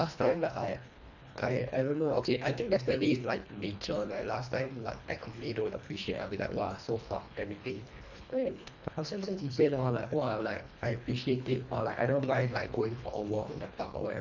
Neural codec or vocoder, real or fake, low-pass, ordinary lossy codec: codec, 24 kHz, 1.5 kbps, HILCodec; fake; 7.2 kHz; none